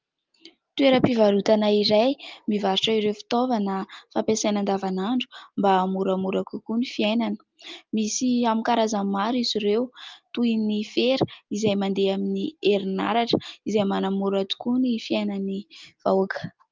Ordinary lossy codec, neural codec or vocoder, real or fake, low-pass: Opus, 32 kbps; none; real; 7.2 kHz